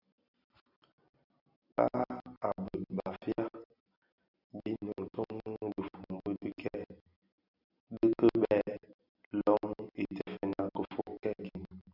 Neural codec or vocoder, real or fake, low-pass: none; real; 5.4 kHz